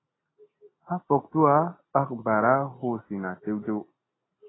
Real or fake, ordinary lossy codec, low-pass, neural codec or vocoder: real; AAC, 16 kbps; 7.2 kHz; none